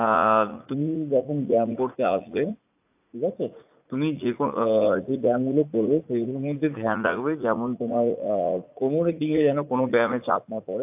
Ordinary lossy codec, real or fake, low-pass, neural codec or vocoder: AAC, 32 kbps; fake; 3.6 kHz; vocoder, 44.1 kHz, 80 mel bands, Vocos